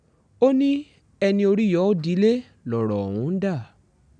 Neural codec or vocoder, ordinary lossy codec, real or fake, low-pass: none; none; real; 9.9 kHz